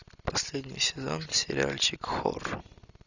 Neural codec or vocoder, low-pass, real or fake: none; 7.2 kHz; real